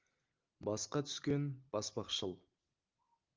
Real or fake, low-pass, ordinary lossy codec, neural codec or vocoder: real; 7.2 kHz; Opus, 32 kbps; none